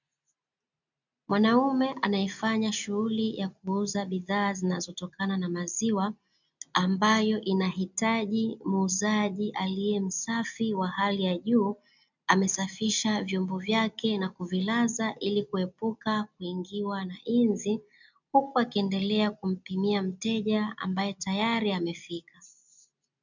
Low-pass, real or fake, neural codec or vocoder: 7.2 kHz; real; none